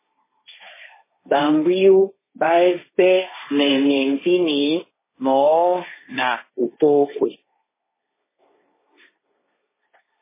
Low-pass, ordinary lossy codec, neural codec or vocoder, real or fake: 3.6 kHz; MP3, 16 kbps; codec, 16 kHz, 1.1 kbps, Voila-Tokenizer; fake